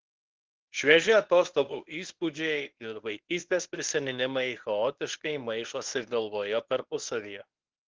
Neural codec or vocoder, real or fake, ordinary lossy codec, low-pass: codec, 24 kHz, 0.9 kbps, WavTokenizer, medium speech release version 1; fake; Opus, 16 kbps; 7.2 kHz